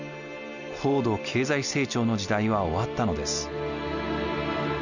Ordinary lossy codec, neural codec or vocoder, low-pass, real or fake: none; none; 7.2 kHz; real